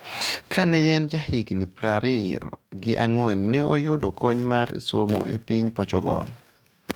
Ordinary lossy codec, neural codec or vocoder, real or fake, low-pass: none; codec, 44.1 kHz, 2.6 kbps, DAC; fake; none